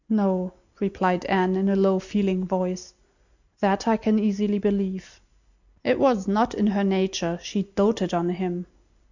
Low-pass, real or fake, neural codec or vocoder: 7.2 kHz; real; none